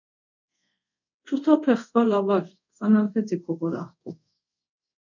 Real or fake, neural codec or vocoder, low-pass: fake; codec, 24 kHz, 0.5 kbps, DualCodec; 7.2 kHz